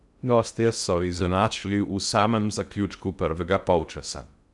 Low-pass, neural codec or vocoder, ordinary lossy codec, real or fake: 10.8 kHz; codec, 16 kHz in and 24 kHz out, 0.6 kbps, FocalCodec, streaming, 2048 codes; none; fake